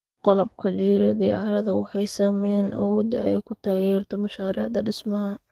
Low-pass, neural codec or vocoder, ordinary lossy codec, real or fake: 14.4 kHz; codec, 32 kHz, 1.9 kbps, SNAC; Opus, 32 kbps; fake